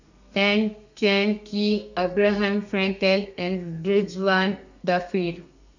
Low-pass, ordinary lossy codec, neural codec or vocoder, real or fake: 7.2 kHz; none; codec, 32 kHz, 1.9 kbps, SNAC; fake